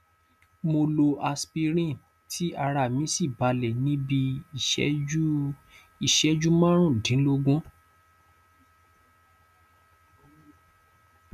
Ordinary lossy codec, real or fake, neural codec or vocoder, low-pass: none; real; none; 14.4 kHz